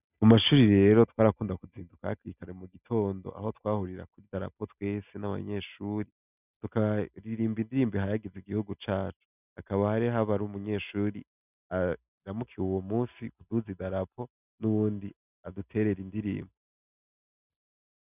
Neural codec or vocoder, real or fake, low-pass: none; real; 3.6 kHz